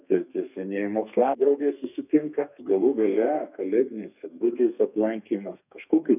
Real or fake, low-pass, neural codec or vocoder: fake; 3.6 kHz; codec, 32 kHz, 1.9 kbps, SNAC